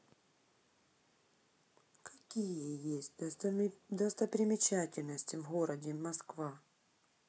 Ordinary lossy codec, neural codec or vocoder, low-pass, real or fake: none; none; none; real